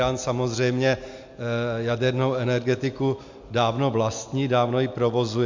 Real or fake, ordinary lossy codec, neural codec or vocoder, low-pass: real; MP3, 64 kbps; none; 7.2 kHz